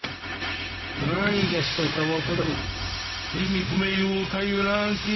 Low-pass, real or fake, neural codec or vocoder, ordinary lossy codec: 7.2 kHz; fake; codec, 16 kHz, 0.4 kbps, LongCat-Audio-Codec; MP3, 24 kbps